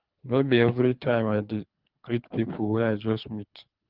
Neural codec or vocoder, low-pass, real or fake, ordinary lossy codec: codec, 24 kHz, 3 kbps, HILCodec; 5.4 kHz; fake; Opus, 24 kbps